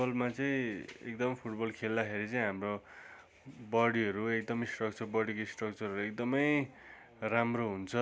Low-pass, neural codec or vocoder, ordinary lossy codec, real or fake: none; none; none; real